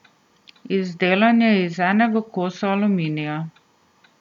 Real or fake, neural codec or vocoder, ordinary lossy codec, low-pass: real; none; none; 19.8 kHz